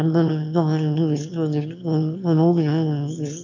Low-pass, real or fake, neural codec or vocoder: 7.2 kHz; fake; autoencoder, 22.05 kHz, a latent of 192 numbers a frame, VITS, trained on one speaker